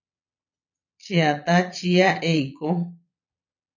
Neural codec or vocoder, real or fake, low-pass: vocoder, 22.05 kHz, 80 mel bands, Vocos; fake; 7.2 kHz